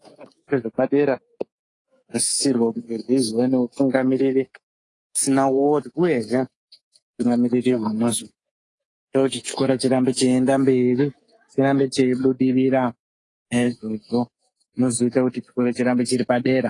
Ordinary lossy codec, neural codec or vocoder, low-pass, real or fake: AAC, 32 kbps; codec, 24 kHz, 3.1 kbps, DualCodec; 10.8 kHz; fake